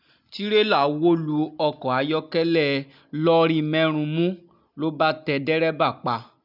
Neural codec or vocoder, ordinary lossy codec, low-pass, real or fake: none; none; 5.4 kHz; real